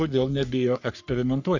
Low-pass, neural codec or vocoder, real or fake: 7.2 kHz; codec, 44.1 kHz, 3.4 kbps, Pupu-Codec; fake